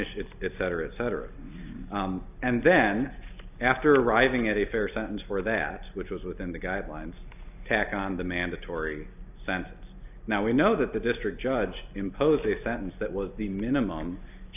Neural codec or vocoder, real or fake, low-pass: none; real; 3.6 kHz